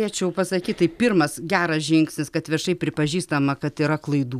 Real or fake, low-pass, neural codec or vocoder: real; 14.4 kHz; none